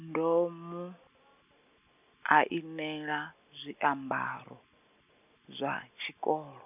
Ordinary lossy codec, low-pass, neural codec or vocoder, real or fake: none; 3.6 kHz; none; real